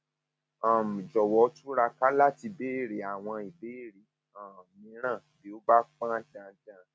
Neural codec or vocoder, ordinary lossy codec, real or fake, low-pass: none; none; real; none